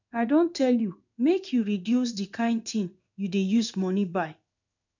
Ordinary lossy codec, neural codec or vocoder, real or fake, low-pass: none; codec, 16 kHz in and 24 kHz out, 1 kbps, XY-Tokenizer; fake; 7.2 kHz